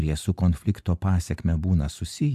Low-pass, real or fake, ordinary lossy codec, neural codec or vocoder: 14.4 kHz; real; AAC, 96 kbps; none